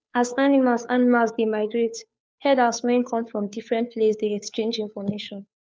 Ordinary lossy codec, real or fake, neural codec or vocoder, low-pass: none; fake; codec, 16 kHz, 2 kbps, FunCodec, trained on Chinese and English, 25 frames a second; none